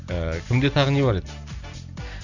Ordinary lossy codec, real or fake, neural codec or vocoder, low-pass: none; real; none; 7.2 kHz